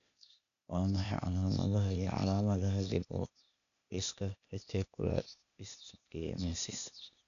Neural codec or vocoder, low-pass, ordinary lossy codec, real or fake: codec, 16 kHz, 0.8 kbps, ZipCodec; 7.2 kHz; none; fake